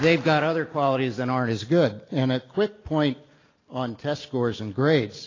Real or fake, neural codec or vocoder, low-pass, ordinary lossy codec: real; none; 7.2 kHz; AAC, 32 kbps